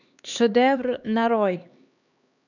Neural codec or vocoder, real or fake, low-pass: codec, 16 kHz, 2 kbps, X-Codec, HuBERT features, trained on LibriSpeech; fake; 7.2 kHz